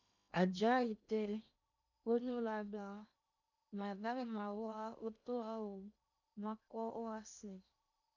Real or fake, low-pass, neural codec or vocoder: fake; 7.2 kHz; codec, 16 kHz in and 24 kHz out, 0.8 kbps, FocalCodec, streaming, 65536 codes